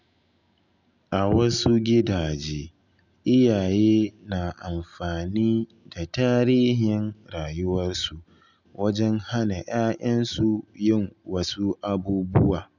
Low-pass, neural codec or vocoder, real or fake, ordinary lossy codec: 7.2 kHz; none; real; none